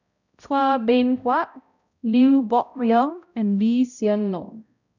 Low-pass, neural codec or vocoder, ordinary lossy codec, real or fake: 7.2 kHz; codec, 16 kHz, 0.5 kbps, X-Codec, HuBERT features, trained on balanced general audio; none; fake